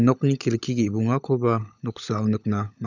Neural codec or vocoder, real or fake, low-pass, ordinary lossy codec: codec, 16 kHz, 16 kbps, FunCodec, trained on LibriTTS, 50 frames a second; fake; 7.2 kHz; none